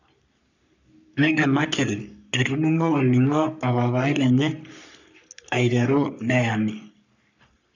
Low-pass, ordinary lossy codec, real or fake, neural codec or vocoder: 7.2 kHz; none; fake; codec, 44.1 kHz, 3.4 kbps, Pupu-Codec